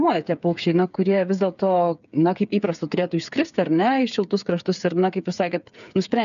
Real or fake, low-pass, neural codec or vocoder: fake; 7.2 kHz; codec, 16 kHz, 8 kbps, FreqCodec, smaller model